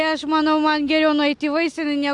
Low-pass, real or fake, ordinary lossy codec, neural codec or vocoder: 10.8 kHz; real; AAC, 64 kbps; none